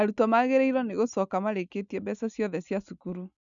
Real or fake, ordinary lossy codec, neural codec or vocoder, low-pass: real; none; none; 7.2 kHz